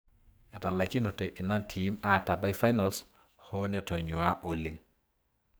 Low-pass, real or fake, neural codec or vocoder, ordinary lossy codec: none; fake; codec, 44.1 kHz, 2.6 kbps, SNAC; none